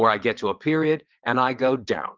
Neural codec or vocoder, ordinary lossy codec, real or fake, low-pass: vocoder, 22.05 kHz, 80 mel bands, WaveNeXt; Opus, 24 kbps; fake; 7.2 kHz